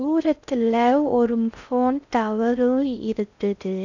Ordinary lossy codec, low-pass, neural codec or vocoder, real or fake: none; 7.2 kHz; codec, 16 kHz in and 24 kHz out, 0.6 kbps, FocalCodec, streaming, 4096 codes; fake